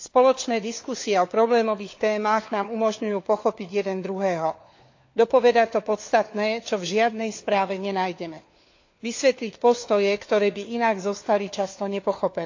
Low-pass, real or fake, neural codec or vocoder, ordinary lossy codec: 7.2 kHz; fake; codec, 16 kHz, 4 kbps, FunCodec, trained on LibriTTS, 50 frames a second; AAC, 48 kbps